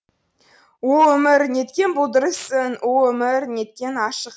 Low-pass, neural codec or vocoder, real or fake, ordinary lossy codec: none; none; real; none